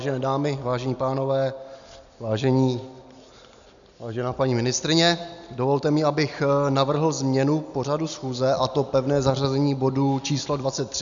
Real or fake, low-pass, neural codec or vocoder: real; 7.2 kHz; none